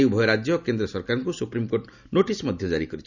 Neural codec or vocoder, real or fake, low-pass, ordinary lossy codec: none; real; 7.2 kHz; none